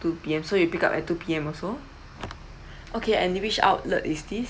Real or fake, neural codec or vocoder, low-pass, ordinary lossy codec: real; none; none; none